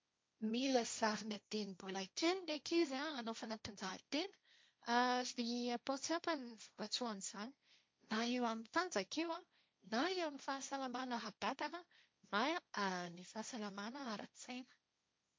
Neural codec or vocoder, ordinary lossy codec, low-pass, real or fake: codec, 16 kHz, 1.1 kbps, Voila-Tokenizer; none; none; fake